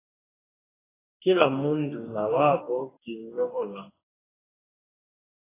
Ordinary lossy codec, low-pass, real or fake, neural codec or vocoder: AAC, 16 kbps; 3.6 kHz; fake; codec, 44.1 kHz, 2.6 kbps, DAC